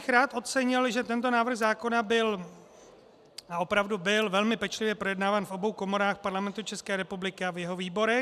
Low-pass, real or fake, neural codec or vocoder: 14.4 kHz; real; none